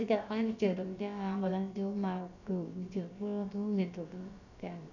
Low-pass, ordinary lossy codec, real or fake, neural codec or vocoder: 7.2 kHz; none; fake; codec, 16 kHz, about 1 kbps, DyCAST, with the encoder's durations